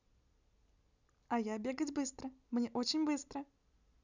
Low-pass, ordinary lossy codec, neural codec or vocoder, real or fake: 7.2 kHz; none; none; real